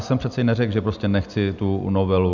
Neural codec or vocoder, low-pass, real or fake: none; 7.2 kHz; real